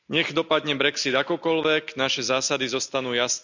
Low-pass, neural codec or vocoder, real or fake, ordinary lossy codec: 7.2 kHz; none; real; none